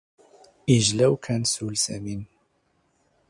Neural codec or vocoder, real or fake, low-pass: none; real; 10.8 kHz